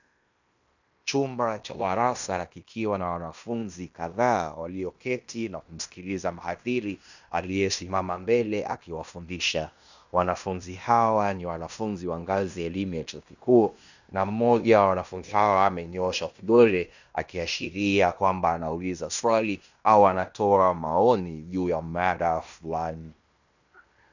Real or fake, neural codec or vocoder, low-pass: fake; codec, 16 kHz in and 24 kHz out, 0.9 kbps, LongCat-Audio-Codec, fine tuned four codebook decoder; 7.2 kHz